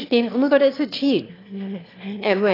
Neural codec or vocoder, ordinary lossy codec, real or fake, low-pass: autoencoder, 22.05 kHz, a latent of 192 numbers a frame, VITS, trained on one speaker; AAC, 24 kbps; fake; 5.4 kHz